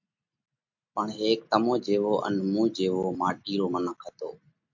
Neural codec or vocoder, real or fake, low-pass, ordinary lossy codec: none; real; 7.2 kHz; MP3, 48 kbps